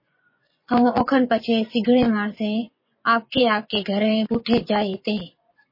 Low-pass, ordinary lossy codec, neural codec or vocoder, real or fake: 5.4 kHz; MP3, 24 kbps; vocoder, 24 kHz, 100 mel bands, Vocos; fake